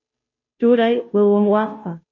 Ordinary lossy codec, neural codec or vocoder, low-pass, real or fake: MP3, 32 kbps; codec, 16 kHz, 0.5 kbps, FunCodec, trained on Chinese and English, 25 frames a second; 7.2 kHz; fake